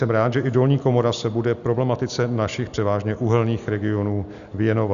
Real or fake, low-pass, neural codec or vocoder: real; 7.2 kHz; none